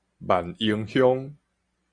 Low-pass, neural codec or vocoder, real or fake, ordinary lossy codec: 9.9 kHz; none; real; Opus, 64 kbps